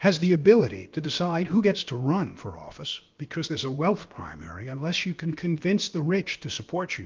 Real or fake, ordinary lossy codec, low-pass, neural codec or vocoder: fake; Opus, 32 kbps; 7.2 kHz; codec, 16 kHz, about 1 kbps, DyCAST, with the encoder's durations